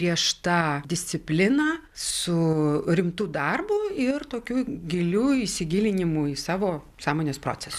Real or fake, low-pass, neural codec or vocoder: real; 14.4 kHz; none